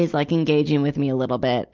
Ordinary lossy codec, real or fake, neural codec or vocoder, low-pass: Opus, 32 kbps; real; none; 7.2 kHz